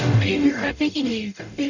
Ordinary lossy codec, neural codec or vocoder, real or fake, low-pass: none; codec, 44.1 kHz, 0.9 kbps, DAC; fake; 7.2 kHz